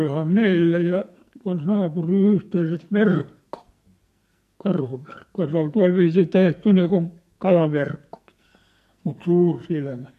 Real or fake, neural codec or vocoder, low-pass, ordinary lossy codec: fake; codec, 44.1 kHz, 2.6 kbps, SNAC; 14.4 kHz; MP3, 64 kbps